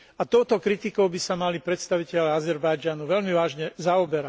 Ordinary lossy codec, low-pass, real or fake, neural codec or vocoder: none; none; real; none